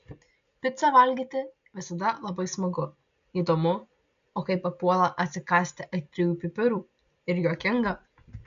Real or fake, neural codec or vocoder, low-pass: real; none; 7.2 kHz